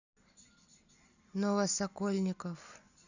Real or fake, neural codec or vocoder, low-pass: real; none; 7.2 kHz